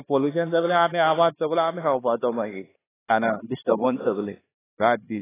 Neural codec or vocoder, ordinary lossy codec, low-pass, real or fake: codec, 16 kHz, 2 kbps, X-Codec, HuBERT features, trained on LibriSpeech; AAC, 16 kbps; 3.6 kHz; fake